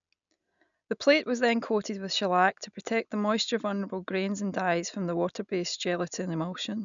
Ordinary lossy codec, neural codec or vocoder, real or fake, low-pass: none; none; real; 7.2 kHz